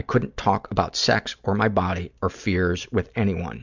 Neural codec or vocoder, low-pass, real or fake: none; 7.2 kHz; real